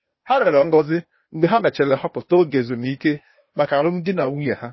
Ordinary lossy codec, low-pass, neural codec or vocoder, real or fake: MP3, 24 kbps; 7.2 kHz; codec, 16 kHz, 0.8 kbps, ZipCodec; fake